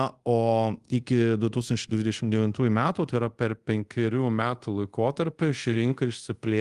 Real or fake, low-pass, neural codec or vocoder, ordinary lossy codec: fake; 10.8 kHz; codec, 24 kHz, 0.5 kbps, DualCodec; Opus, 24 kbps